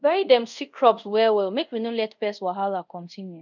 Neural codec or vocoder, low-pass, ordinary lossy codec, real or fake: codec, 24 kHz, 0.5 kbps, DualCodec; 7.2 kHz; none; fake